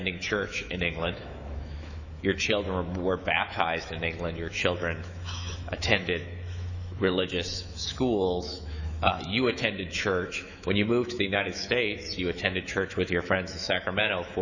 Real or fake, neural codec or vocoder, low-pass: fake; autoencoder, 48 kHz, 128 numbers a frame, DAC-VAE, trained on Japanese speech; 7.2 kHz